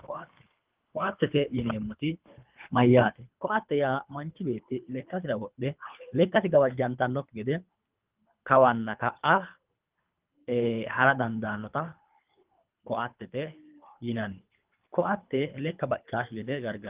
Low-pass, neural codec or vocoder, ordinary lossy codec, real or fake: 3.6 kHz; codec, 24 kHz, 3 kbps, HILCodec; Opus, 32 kbps; fake